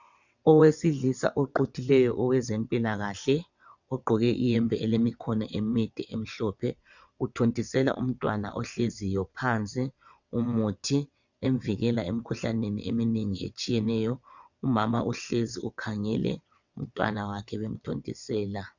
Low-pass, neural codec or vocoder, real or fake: 7.2 kHz; vocoder, 22.05 kHz, 80 mel bands, WaveNeXt; fake